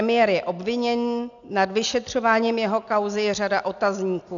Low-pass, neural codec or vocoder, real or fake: 7.2 kHz; none; real